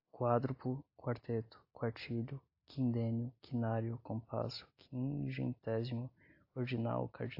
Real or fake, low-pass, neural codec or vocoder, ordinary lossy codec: real; 5.4 kHz; none; AAC, 48 kbps